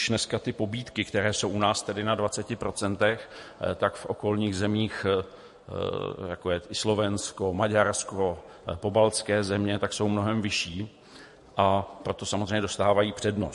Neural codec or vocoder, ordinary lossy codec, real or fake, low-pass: vocoder, 48 kHz, 128 mel bands, Vocos; MP3, 48 kbps; fake; 14.4 kHz